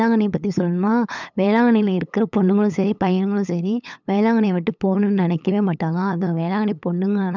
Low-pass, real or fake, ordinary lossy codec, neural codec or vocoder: 7.2 kHz; fake; none; codec, 16 kHz, 8 kbps, FunCodec, trained on LibriTTS, 25 frames a second